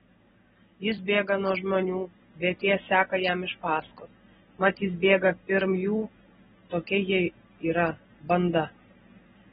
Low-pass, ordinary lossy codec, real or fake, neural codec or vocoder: 10.8 kHz; AAC, 16 kbps; real; none